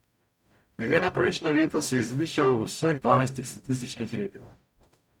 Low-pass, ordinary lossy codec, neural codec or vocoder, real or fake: 19.8 kHz; none; codec, 44.1 kHz, 0.9 kbps, DAC; fake